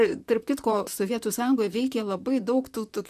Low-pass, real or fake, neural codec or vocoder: 14.4 kHz; fake; vocoder, 44.1 kHz, 128 mel bands, Pupu-Vocoder